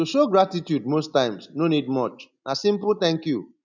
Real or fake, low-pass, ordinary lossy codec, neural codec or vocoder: real; 7.2 kHz; none; none